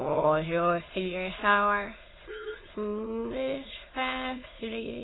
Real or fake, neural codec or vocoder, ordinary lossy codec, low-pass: fake; autoencoder, 22.05 kHz, a latent of 192 numbers a frame, VITS, trained on many speakers; AAC, 16 kbps; 7.2 kHz